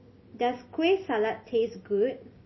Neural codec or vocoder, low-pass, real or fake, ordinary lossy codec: none; 7.2 kHz; real; MP3, 24 kbps